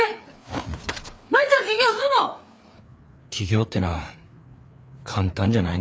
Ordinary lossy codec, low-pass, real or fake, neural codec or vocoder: none; none; fake; codec, 16 kHz, 4 kbps, FreqCodec, larger model